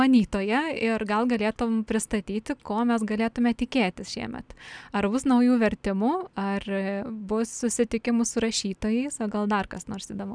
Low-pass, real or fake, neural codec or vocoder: 9.9 kHz; real; none